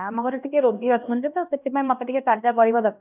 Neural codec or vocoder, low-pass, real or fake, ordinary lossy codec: codec, 16 kHz, 1 kbps, X-Codec, HuBERT features, trained on LibriSpeech; 3.6 kHz; fake; none